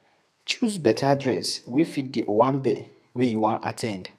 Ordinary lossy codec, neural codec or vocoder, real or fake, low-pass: none; codec, 32 kHz, 1.9 kbps, SNAC; fake; 14.4 kHz